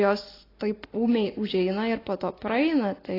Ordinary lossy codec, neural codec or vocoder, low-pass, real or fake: AAC, 24 kbps; none; 5.4 kHz; real